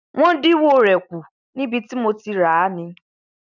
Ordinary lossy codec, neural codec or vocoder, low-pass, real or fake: none; none; 7.2 kHz; real